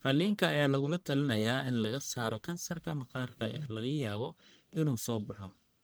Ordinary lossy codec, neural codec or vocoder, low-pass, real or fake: none; codec, 44.1 kHz, 1.7 kbps, Pupu-Codec; none; fake